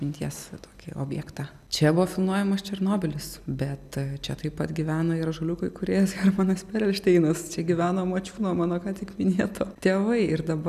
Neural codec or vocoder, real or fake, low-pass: none; real; 14.4 kHz